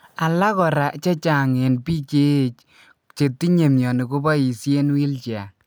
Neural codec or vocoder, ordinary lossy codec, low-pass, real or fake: none; none; none; real